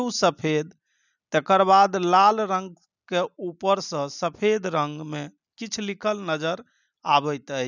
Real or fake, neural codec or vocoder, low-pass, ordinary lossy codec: real; none; 7.2 kHz; none